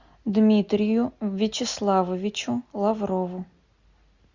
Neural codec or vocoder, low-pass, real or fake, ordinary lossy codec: none; 7.2 kHz; real; Opus, 64 kbps